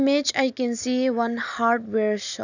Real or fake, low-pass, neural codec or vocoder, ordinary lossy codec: real; 7.2 kHz; none; none